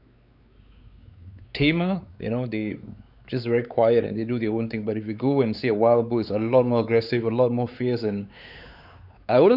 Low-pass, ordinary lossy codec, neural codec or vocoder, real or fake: 5.4 kHz; none; codec, 16 kHz, 4 kbps, X-Codec, WavLM features, trained on Multilingual LibriSpeech; fake